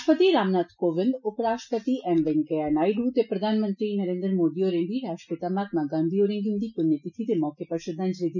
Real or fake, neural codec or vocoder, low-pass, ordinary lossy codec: real; none; 7.2 kHz; none